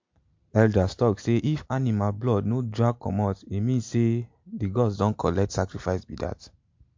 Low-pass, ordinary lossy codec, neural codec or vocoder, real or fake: 7.2 kHz; MP3, 48 kbps; none; real